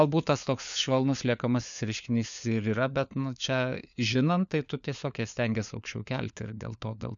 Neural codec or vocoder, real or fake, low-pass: codec, 16 kHz, 4 kbps, FunCodec, trained on LibriTTS, 50 frames a second; fake; 7.2 kHz